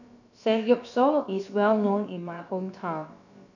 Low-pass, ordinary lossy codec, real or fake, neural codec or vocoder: 7.2 kHz; none; fake; codec, 16 kHz, about 1 kbps, DyCAST, with the encoder's durations